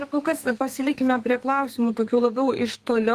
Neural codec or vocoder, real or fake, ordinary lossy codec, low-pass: codec, 32 kHz, 1.9 kbps, SNAC; fake; Opus, 32 kbps; 14.4 kHz